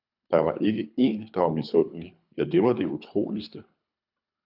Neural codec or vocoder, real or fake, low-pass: codec, 24 kHz, 3 kbps, HILCodec; fake; 5.4 kHz